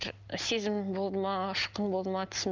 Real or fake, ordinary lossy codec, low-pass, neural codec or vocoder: real; Opus, 24 kbps; 7.2 kHz; none